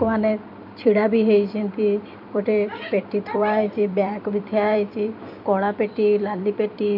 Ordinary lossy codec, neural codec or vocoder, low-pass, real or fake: AAC, 48 kbps; vocoder, 44.1 kHz, 128 mel bands every 256 samples, BigVGAN v2; 5.4 kHz; fake